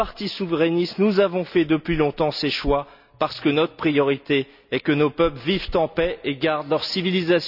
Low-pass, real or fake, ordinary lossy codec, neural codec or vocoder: 5.4 kHz; real; none; none